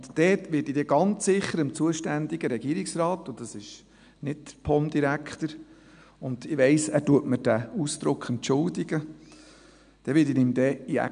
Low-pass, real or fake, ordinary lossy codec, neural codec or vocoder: 9.9 kHz; real; none; none